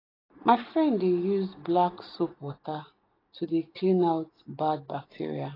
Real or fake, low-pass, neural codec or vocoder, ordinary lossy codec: real; 5.4 kHz; none; AAC, 24 kbps